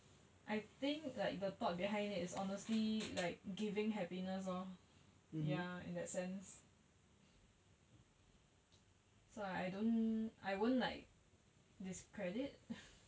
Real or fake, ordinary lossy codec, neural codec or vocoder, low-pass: real; none; none; none